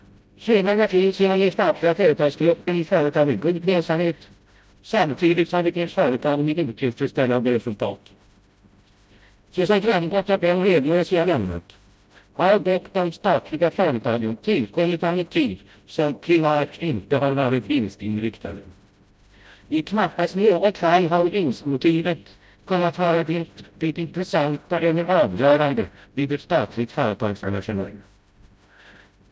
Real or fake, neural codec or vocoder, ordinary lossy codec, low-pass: fake; codec, 16 kHz, 0.5 kbps, FreqCodec, smaller model; none; none